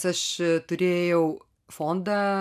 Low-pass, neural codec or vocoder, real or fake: 14.4 kHz; none; real